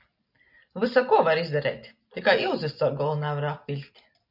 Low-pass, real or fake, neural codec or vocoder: 5.4 kHz; real; none